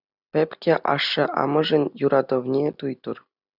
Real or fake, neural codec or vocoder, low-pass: real; none; 5.4 kHz